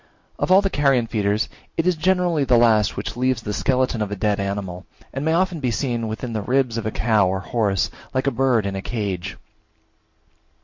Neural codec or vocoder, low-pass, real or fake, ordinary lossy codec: none; 7.2 kHz; real; MP3, 48 kbps